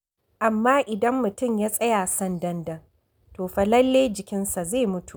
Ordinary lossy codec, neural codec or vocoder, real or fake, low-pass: none; none; real; none